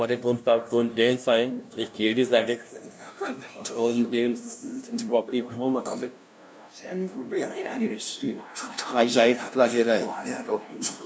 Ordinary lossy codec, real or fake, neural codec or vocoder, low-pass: none; fake; codec, 16 kHz, 0.5 kbps, FunCodec, trained on LibriTTS, 25 frames a second; none